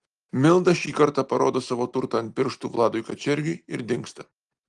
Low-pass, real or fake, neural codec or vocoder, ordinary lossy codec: 10.8 kHz; real; none; Opus, 24 kbps